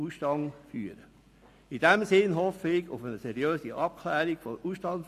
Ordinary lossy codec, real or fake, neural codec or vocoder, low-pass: AAC, 64 kbps; real; none; 14.4 kHz